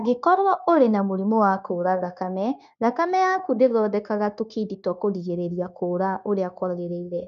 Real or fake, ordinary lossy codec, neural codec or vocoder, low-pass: fake; none; codec, 16 kHz, 0.9 kbps, LongCat-Audio-Codec; 7.2 kHz